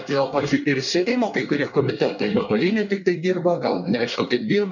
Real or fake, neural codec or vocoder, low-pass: fake; codec, 24 kHz, 1 kbps, SNAC; 7.2 kHz